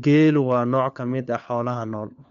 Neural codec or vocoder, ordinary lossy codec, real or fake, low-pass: codec, 16 kHz, 4 kbps, FunCodec, trained on LibriTTS, 50 frames a second; MP3, 64 kbps; fake; 7.2 kHz